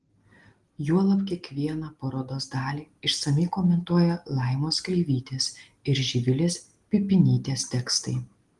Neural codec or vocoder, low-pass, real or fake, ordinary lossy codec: none; 10.8 kHz; real; Opus, 24 kbps